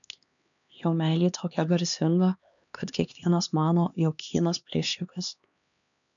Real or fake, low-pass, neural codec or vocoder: fake; 7.2 kHz; codec, 16 kHz, 2 kbps, X-Codec, HuBERT features, trained on LibriSpeech